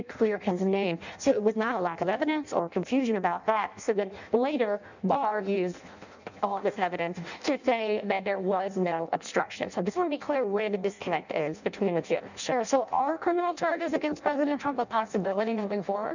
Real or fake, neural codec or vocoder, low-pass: fake; codec, 16 kHz in and 24 kHz out, 0.6 kbps, FireRedTTS-2 codec; 7.2 kHz